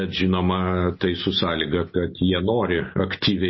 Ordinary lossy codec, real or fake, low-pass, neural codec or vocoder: MP3, 24 kbps; real; 7.2 kHz; none